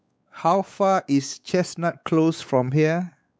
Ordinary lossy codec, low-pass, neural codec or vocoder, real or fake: none; none; codec, 16 kHz, 4 kbps, X-Codec, WavLM features, trained on Multilingual LibriSpeech; fake